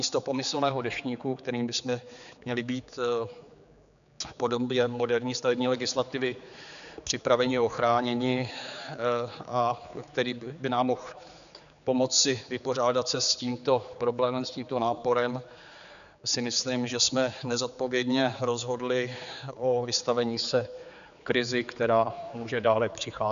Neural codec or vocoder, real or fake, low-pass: codec, 16 kHz, 4 kbps, X-Codec, HuBERT features, trained on general audio; fake; 7.2 kHz